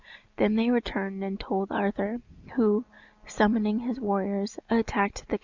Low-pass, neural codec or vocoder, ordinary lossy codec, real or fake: 7.2 kHz; none; Opus, 64 kbps; real